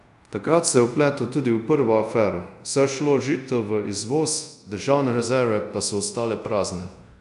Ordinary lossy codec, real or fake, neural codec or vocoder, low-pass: none; fake; codec, 24 kHz, 0.5 kbps, DualCodec; 10.8 kHz